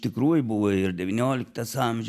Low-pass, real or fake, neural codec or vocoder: 14.4 kHz; real; none